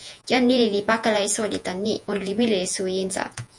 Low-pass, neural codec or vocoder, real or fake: 10.8 kHz; vocoder, 48 kHz, 128 mel bands, Vocos; fake